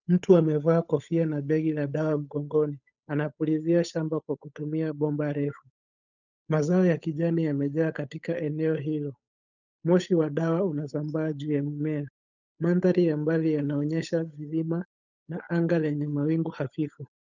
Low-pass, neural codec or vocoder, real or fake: 7.2 kHz; codec, 16 kHz, 8 kbps, FunCodec, trained on Chinese and English, 25 frames a second; fake